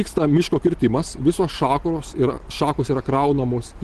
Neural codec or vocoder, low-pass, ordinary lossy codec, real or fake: none; 10.8 kHz; Opus, 16 kbps; real